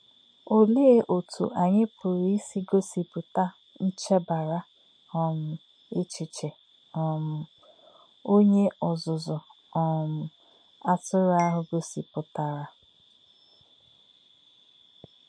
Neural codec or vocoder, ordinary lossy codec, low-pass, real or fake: none; MP3, 48 kbps; 9.9 kHz; real